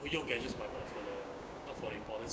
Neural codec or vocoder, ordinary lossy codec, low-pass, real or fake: codec, 16 kHz, 6 kbps, DAC; none; none; fake